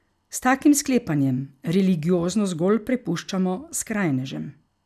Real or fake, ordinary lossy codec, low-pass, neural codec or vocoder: real; none; 14.4 kHz; none